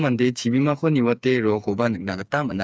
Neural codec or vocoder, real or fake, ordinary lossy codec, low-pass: codec, 16 kHz, 4 kbps, FreqCodec, smaller model; fake; none; none